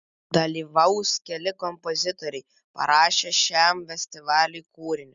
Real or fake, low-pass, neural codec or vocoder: real; 7.2 kHz; none